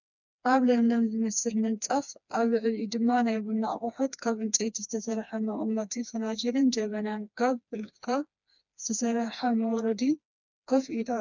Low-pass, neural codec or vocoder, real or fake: 7.2 kHz; codec, 16 kHz, 2 kbps, FreqCodec, smaller model; fake